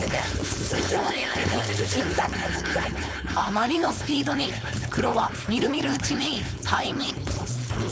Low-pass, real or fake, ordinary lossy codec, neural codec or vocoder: none; fake; none; codec, 16 kHz, 4.8 kbps, FACodec